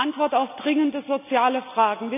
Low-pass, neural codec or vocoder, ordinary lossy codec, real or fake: 3.6 kHz; none; none; real